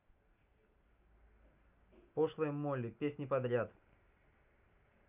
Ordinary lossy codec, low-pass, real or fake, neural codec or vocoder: none; 3.6 kHz; real; none